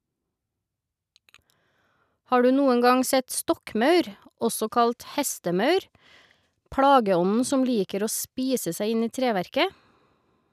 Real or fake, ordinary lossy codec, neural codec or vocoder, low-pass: real; none; none; 14.4 kHz